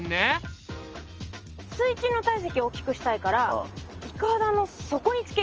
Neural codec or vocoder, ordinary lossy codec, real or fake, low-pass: none; Opus, 24 kbps; real; 7.2 kHz